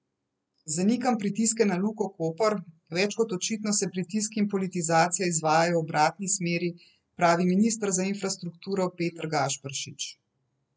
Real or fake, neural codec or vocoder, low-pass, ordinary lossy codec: real; none; none; none